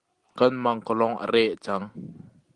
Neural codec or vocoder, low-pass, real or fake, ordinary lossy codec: none; 10.8 kHz; real; Opus, 24 kbps